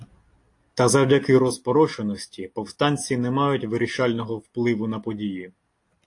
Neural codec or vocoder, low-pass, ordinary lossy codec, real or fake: none; 10.8 kHz; AAC, 48 kbps; real